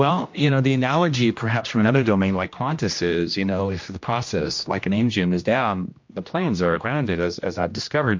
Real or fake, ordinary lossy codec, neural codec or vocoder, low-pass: fake; MP3, 48 kbps; codec, 16 kHz, 1 kbps, X-Codec, HuBERT features, trained on general audio; 7.2 kHz